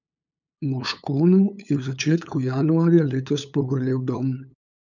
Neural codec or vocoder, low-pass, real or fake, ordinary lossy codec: codec, 16 kHz, 8 kbps, FunCodec, trained on LibriTTS, 25 frames a second; 7.2 kHz; fake; none